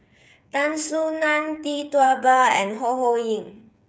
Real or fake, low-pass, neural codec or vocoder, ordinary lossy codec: fake; none; codec, 16 kHz, 8 kbps, FreqCodec, smaller model; none